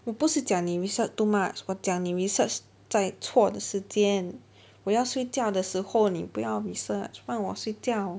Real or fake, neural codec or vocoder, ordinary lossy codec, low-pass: real; none; none; none